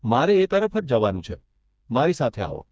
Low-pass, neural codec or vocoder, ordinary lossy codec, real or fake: none; codec, 16 kHz, 2 kbps, FreqCodec, smaller model; none; fake